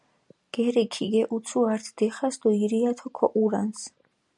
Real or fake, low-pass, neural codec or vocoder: real; 10.8 kHz; none